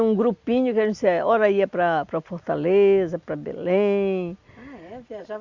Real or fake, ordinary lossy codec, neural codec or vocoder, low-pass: real; Opus, 64 kbps; none; 7.2 kHz